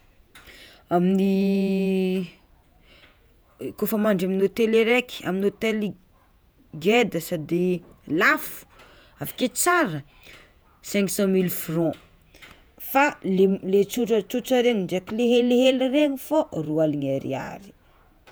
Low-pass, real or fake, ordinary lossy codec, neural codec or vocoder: none; fake; none; vocoder, 48 kHz, 128 mel bands, Vocos